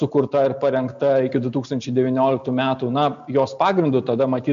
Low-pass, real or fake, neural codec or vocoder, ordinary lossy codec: 7.2 kHz; real; none; AAC, 96 kbps